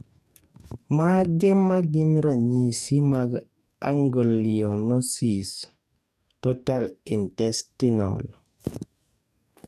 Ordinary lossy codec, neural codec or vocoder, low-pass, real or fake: none; codec, 44.1 kHz, 2.6 kbps, DAC; 14.4 kHz; fake